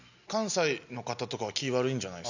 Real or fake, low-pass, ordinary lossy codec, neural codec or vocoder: real; 7.2 kHz; none; none